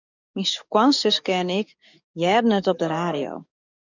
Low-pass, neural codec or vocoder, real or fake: 7.2 kHz; codec, 44.1 kHz, 7.8 kbps, DAC; fake